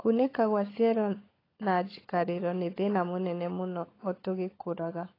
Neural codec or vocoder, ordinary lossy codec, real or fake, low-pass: codec, 16 kHz, 16 kbps, FunCodec, trained on LibriTTS, 50 frames a second; AAC, 24 kbps; fake; 5.4 kHz